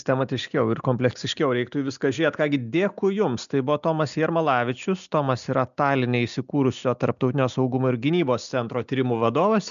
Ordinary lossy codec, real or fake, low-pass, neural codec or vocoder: MP3, 96 kbps; real; 7.2 kHz; none